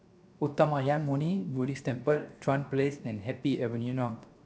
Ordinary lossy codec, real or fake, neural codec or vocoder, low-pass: none; fake; codec, 16 kHz, 0.7 kbps, FocalCodec; none